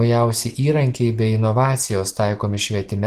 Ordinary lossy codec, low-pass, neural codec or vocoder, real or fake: Opus, 16 kbps; 14.4 kHz; none; real